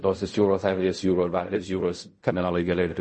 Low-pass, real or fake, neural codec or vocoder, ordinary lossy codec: 10.8 kHz; fake; codec, 16 kHz in and 24 kHz out, 0.4 kbps, LongCat-Audio-Codec, fine tuned four codebook decoder; MP3, 32 kbps